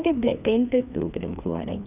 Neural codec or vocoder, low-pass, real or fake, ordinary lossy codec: codec, 16 kHz, 2 kbps, FreqCodec, larger model; 3.6 kHz; fake; none